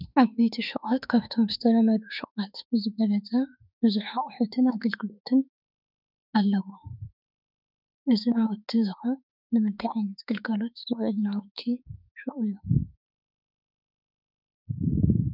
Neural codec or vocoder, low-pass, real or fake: autoencoder, 48 kHz, 32 numbers a frame, DAC-VAE, trained on Japanese speech; 5.4 kHz; fake